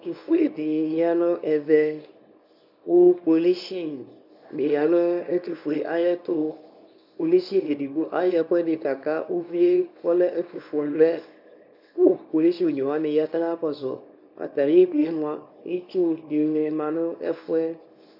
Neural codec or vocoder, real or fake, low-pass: codec, 24 kHz, 0.9 kbps, WavTokenizer, small release; fake; 5.4 kHz